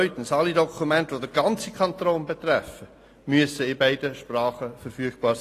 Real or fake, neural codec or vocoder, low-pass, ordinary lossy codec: real; none; 14.4 kHz; AAC, 48 kbps